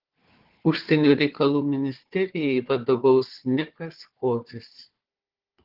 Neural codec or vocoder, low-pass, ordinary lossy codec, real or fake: codec, 16 kHz, 4 kbps, FunCodec, trained on Chinese and English, 50 frames a second; 5.4 kHz; Opus, 16 kbps; fake